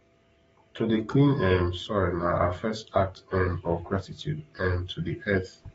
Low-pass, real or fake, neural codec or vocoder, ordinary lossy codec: 19.8 kHz; fake; codec, 44.1 kHz, 7.8 kbps, DAC; AAC, 24 kbps